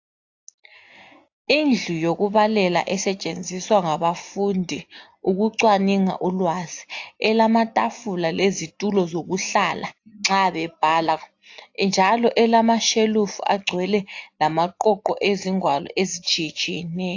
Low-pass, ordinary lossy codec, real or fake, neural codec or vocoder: 7.2 kHz; AAC, 48 kbps; real; none